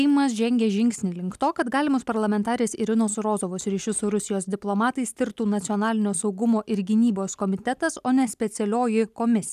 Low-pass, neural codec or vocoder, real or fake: 14.4 kHz; none; real